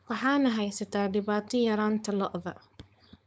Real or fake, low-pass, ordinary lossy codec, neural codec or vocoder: fake; none; none; codec, 16 kHz, 4.8 kbps, FACodec